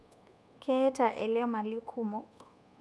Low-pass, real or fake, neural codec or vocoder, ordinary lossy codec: none; fake; codec, 24 kHz, 1.2 kbps, DualCodec; none